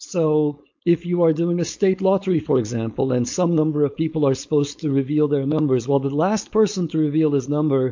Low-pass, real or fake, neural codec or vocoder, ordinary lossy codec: 7.2 kHz; fake; codec, 16 kHz, 4.8 kbps, FACodec; MP3, 48 kbps